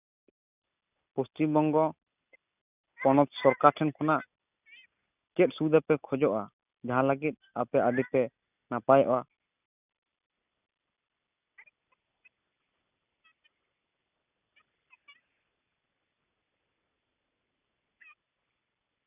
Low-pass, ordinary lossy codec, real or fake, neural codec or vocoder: 3.6 kHz; none; real; none